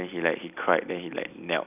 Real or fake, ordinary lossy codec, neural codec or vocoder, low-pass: real; none; none; 3.6 kHz